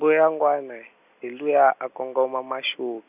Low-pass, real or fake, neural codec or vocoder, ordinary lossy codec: 3.6 kHz; real; none; none